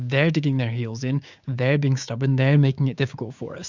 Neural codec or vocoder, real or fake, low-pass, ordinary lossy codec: codec, 16 kHz, 6 kbps, DAC; fake; 7.2 kHz; Opus, 64 kbps